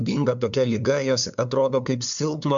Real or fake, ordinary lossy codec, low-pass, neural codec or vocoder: fake; MP3, 64 kbps; 7.2 kHz; codec, 16 kHz, 2 kbps, FunCodec, trained on LibriTTS, 25 frames a second